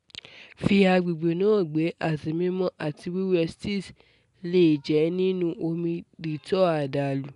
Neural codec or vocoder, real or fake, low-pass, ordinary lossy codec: none; real; 10.8 kHz; none